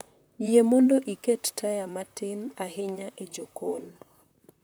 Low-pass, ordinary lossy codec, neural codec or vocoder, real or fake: none; none; vocoder, 44.1 kHz, 128 mel bands, Pupu-Vocoder; fake